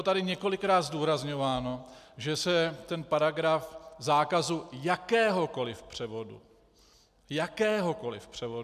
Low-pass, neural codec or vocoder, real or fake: 14.4 kHz; none; real